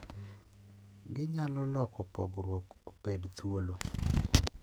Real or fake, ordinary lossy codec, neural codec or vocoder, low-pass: fake; none; codec, 44.1 kHz, 2.6 kbps, SNAC; none